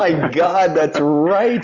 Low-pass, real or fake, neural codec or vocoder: 7.2 kHz; real; none